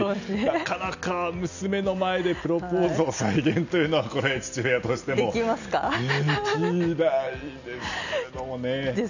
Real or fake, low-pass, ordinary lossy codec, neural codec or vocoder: real; 7.2 kHz; none; none